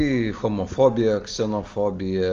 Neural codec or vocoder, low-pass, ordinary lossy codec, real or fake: none; 7.2 kHz; Opus, 32 kbps; real